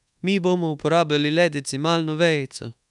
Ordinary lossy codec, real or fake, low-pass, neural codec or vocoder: none; fake; 10.8 kHz; codec, 24 kHz, 1.2 kbps, DualCodec